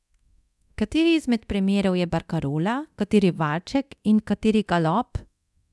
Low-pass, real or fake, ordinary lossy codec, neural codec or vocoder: none; fake; none; codec, 24 kHz, 0.9 kbps, DualCodec